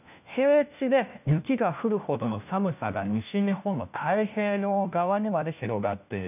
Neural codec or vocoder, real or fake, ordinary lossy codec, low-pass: codec, 16 kHz, 1 kbps, FunCodec, trained on LibriTTS, 50 frames a second; fake; none; 3.6 kHz